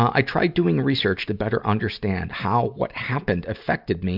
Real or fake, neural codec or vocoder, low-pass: real; none; 5.4 kHz